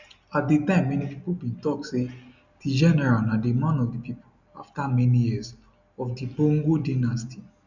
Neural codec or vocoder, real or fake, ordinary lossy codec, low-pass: none; real; none; 7.2 kHz